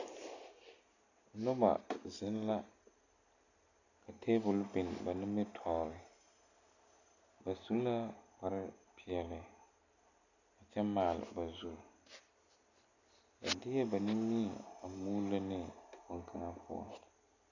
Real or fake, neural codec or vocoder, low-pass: real; none; 7.2 kHz